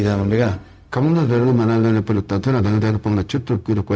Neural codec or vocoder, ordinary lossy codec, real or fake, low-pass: codec, 16 kHz, 0.4 kbps, LongCat-Audio-Codec; none; fake; none